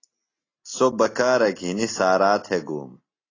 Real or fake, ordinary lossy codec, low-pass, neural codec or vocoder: real; AAC, 32 kbps; 7.2 kHz; none